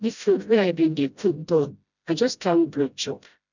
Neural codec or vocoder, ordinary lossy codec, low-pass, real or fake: codec, 16 kHz, 0.5 kbps, FreqCodec, smaller model; none; 7.2 kHz; fake